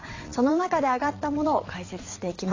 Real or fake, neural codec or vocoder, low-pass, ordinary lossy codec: fake; vocoder, 22.05 kHz, 80 mel bands, WaveNeXt; 7.2 kHz; AAC, 32 kbps